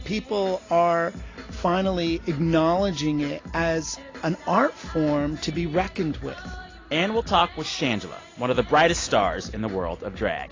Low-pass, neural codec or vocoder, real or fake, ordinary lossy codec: 7.2 kHz; none; real; AAC, 32 kbps